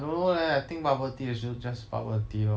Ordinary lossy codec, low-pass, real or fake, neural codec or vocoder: none; none; real; none